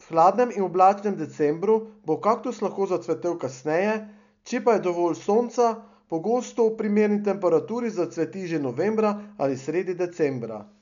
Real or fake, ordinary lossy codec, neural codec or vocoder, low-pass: real; none; none; 7.2 kHz